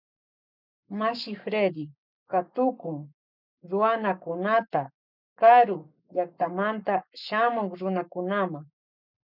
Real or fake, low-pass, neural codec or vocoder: fake; 5.4 kHz; codec, 44.1 kHz, 7.8 kbps, Pupu-Codec